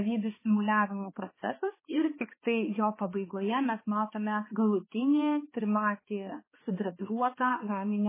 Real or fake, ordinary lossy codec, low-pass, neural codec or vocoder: fake; MP3, 16 kbps; 3.6 kHz; codec, 16 kHz, 2 kbps, X-Codec, HuBERT features, trained on balanced general audio